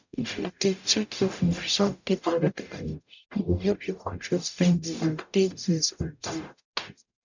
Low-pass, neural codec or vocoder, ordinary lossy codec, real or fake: 7.2 kHz; codec, 44.1 kHz, 0.9 kbps, DAC; none; fake